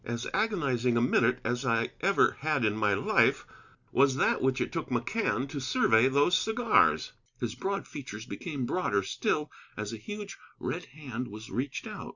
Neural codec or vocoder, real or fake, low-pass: none; real; 7.2 kHz